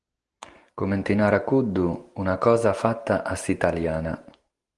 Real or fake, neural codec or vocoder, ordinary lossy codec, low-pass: real; none; Opus, 24 kbps; 10.8 kHz